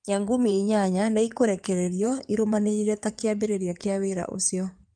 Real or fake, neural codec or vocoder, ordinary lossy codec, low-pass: fake; codec, 16 kHz in and 24 kHz out, 2.2 kbps, FireRedTTS-2 codec; Opus, 32 kbps; 9.9 kHz